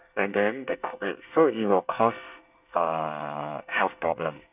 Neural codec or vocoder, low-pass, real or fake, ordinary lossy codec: codec, 24 kHz, 1 kbps, SNAC; 3.6 kHz; fake; none